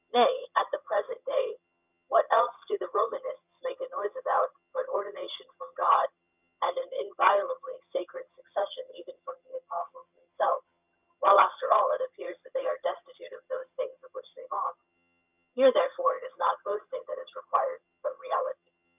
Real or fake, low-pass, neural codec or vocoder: fake; 3.6 kHz; vocoder, 22.05 kHz, 80 mel bands, HiFi-GAN